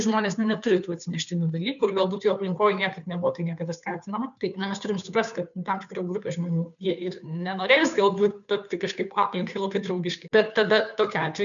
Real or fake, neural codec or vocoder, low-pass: fake; codec, 16 kHz, 2 kbps, FunCodec, trained on Chinese and English, 25 frames a second; 7.2 kHz